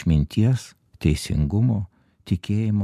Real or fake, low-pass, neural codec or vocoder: real; 14.4 kHz; none